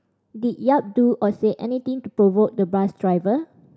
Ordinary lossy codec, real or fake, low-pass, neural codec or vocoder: none; real; none; none